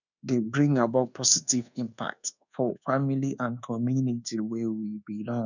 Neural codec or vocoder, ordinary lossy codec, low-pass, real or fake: codec, 24 kHz, 1.2 kbps, DualCodec; none; 7.2 kHz; fake